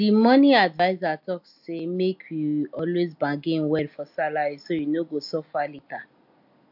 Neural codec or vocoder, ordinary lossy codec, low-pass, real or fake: none; AAC, 48 kbps; 5.4 kHz; real